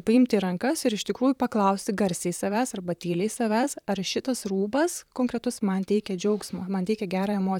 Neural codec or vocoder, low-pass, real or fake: vocoder, 44.1 kHz, 128 mel bands, Pupu-Vocoder; 19.8 kHz; fake